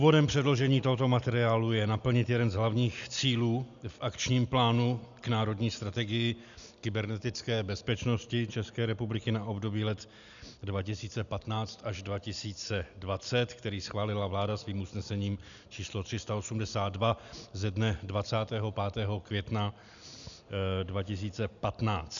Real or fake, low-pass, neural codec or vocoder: real; 7.2 kHz; none